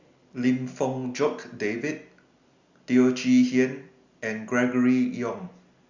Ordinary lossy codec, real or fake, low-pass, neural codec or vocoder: Opus, 64 kbps; real; 7.2 kHz; none